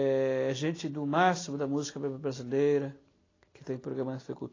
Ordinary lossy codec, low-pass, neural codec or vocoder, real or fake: AAC, 32 kbps; 7.2 kHz; none; real